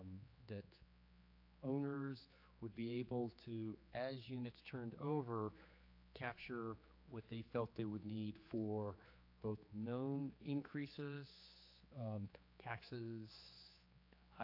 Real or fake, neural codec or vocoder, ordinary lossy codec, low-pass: fake; codec, 16 kHz, 4 kbps, X-Codec, HuBERT features, trained on general audio; AAC, 32 kbps; 5.4 kHz